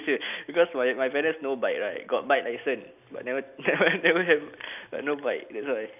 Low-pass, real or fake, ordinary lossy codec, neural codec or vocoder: 3.6 kHz; real; none; none